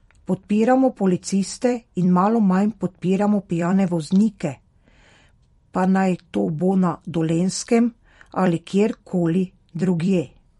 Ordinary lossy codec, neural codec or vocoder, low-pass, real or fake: MP3, 48 kbps; vocoder, 44.1 kHz, 128 mel bands every 256 samples, BigVGAN v2; 19.8 kHz; fake